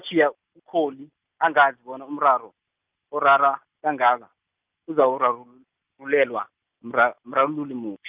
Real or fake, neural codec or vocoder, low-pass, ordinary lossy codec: real; none; 3.6 kHz; Opus, 24 kbps